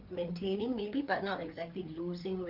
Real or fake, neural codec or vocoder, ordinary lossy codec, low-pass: fake; codec, 16 kHz, 4 kbps, FreqCodec, larger model; Opus, 24 kbps; 5.4 kHz